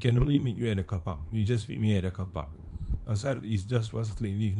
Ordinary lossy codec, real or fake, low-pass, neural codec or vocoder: MP3, 64 kbps; fake; 10.8 kHz; codec, 24 kHz, 0.9 kbps, WavTokenizer, small release